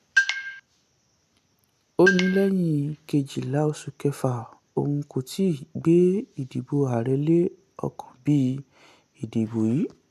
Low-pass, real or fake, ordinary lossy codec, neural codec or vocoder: 14.4 kHz; real; none; none